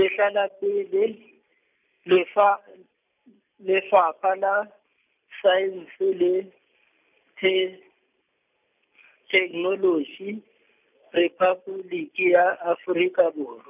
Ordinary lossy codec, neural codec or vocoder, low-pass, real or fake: none; none; 3.6 kHz; real